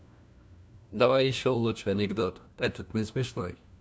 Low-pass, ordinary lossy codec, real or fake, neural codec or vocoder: none; none; fake; codec, 16 kHz, 1 kbps, FunCodec, trained on LibriTTS, 50 frames a second